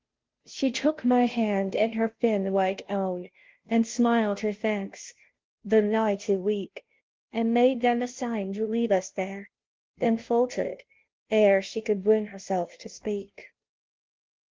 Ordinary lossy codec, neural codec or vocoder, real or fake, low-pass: Opus, 16 kbps; codec, 16 kHz, 0.5 kbps, FunCodec, trained on Chinese and English, 25 frames a second; fake; 7.2 kHz